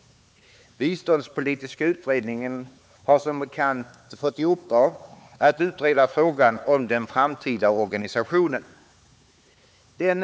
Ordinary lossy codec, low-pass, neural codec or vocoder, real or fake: none; none; codec, 16 kHz, 4 kbps, X-Codec, HuBERT features, trained on LibriSpeech; fake